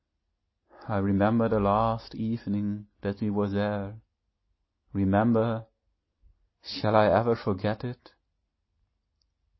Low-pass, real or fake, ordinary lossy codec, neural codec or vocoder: 7.2 kHz; real; MP3, 24 kbps; none